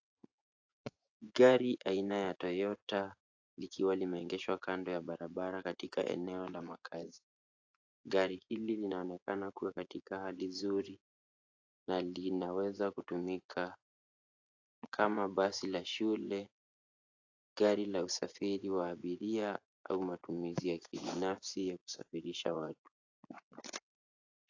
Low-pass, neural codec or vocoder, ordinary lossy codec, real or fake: 7.2 kHz; vocoder, 24 kHz, 100 mel bands, Vocos; AAC, 48 kbps; fake